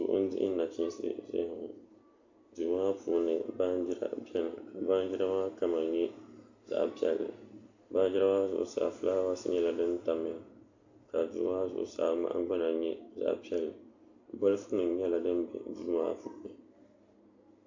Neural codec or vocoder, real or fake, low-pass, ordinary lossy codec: none; real; 7.2 kHz; AAC, 48 kbps